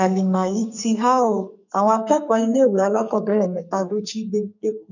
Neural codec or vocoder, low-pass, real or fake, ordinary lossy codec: codec, 44.1 kHz, 2.6 kbps, SNAC; 7.2 kHz; fake; none